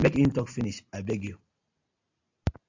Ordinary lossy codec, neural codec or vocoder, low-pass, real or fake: AAC, 48 kbps; none; 7.2 kHz; real